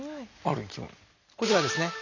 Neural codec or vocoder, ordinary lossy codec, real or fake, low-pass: none; none; real; 7.2 kHz